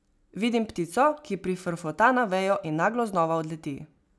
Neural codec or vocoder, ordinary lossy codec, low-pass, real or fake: none; none; none; real